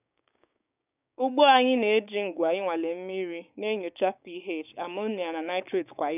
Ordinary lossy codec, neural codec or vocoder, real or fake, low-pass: none; codec, 16 kHz, 6 kbps, DAC; fake; 3.6 kHz